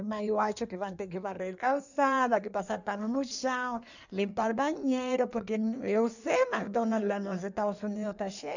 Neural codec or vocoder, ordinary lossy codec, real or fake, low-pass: codec, 16 kHz in and 24 kHz out, 1.1 kbps, FireRedTTS-2 codec; none; fake; 7.2 kHz